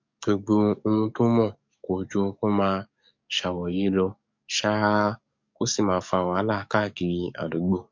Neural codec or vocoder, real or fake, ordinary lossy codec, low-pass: codec, 44.1 kHz, 7.8 kbps, DAC; fake; MP3, 48 kbps; 7.2 kHz